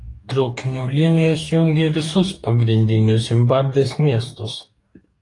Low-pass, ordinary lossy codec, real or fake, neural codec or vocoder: 10.8 kHz; AAC, 48 kbps; fake; codec, 44.1 kHz, 2.6 kbps, DAC